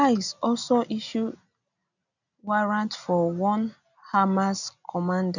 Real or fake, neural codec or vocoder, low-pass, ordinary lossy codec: real; none; 7.2 kHz; none